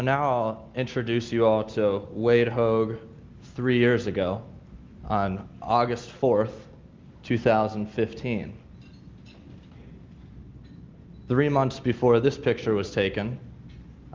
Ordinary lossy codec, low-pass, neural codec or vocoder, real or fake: Opus, 32 kbps; 7.2 kHz; none; real